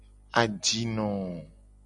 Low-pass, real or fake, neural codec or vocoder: 10.8 kHz; real; none